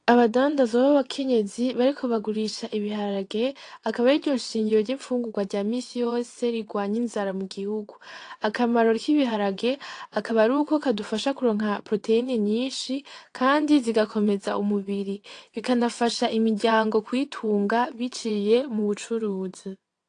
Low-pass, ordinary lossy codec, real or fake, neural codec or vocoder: 9.9 kHz; AAC, 48 kbps; fake; vocoder, 22.05 kHz, 80 mel bands, WaveNeXt